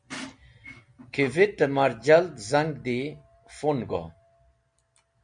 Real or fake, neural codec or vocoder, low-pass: real; none; 9.9 kHz